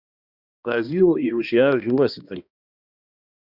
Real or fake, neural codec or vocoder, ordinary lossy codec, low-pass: fake; codec, 16 kHz, 1 kbps, X-Codec, HuBERT features, trained on balanced general audio; Opus, 64 kbps; 5.4 kHz